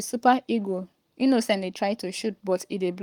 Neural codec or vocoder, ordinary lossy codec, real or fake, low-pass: codec, 44.1 kHz, 7.8 kbps, Pupu-Codec; Opus, 32 kbps; fake; 19.8 kHz